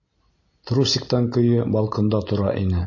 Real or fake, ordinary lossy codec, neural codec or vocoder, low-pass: real; MP3, 32 kbps; none; 7.2 kHz